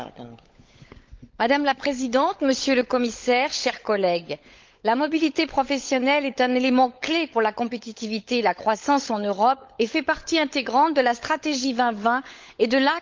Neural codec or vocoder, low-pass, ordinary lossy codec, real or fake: codec, 16 kHz, 16 kbps, FunCodec, trained on LibriTTS, 50 frames a second; 7.2 kHz; Opus, 24 kbps; fake